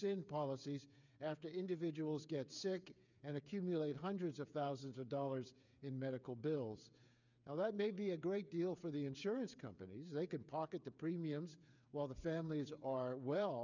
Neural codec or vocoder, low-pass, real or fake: codec, 16 kHz, 8 kbps, FreqCodec, smaller model; 7.2 kHz; fake